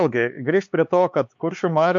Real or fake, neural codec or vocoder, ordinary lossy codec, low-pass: fake; codec, 16 kHz, 4 kbps, X-Codec, HuBERT features, trained on LibriSpeech; MP3, 48 kbps; 7.2 kHz